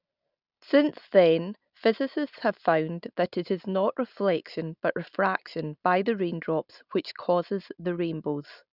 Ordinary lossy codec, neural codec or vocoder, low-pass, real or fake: none; none; 5.4 kHz; real